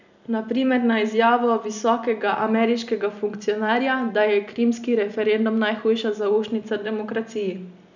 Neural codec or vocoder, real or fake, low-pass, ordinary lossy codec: none; real; 7.2 kHz; none